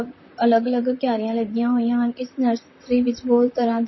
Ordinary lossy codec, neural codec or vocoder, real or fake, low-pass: MP3, 24 kbps; vocoder, 44.1 kHz, 128 mel bands, Pupu-Vocoder; fake; 7.2 kHz